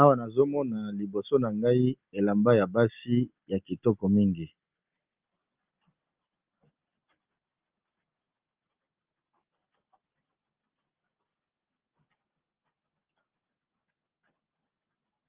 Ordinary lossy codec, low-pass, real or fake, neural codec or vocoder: Opus, 24 kbps; 3.6 kHz; real; none